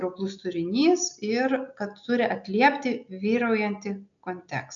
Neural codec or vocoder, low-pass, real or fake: none; 7.2 kHz; real